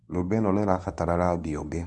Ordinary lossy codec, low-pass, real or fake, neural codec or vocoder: none; none; fake; codec, 24 kHz, 0.9 kbps, WavTokenizer, medium speech release version 1